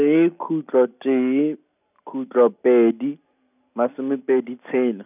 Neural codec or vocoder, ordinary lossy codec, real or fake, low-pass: none; none; real; 3.6 kHz